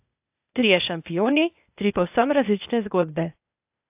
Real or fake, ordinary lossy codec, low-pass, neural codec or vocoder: fake; AAC, 32 kbps; 3.6 kHz; codec, 16 kHz, 0.8 kbps, ZipCodec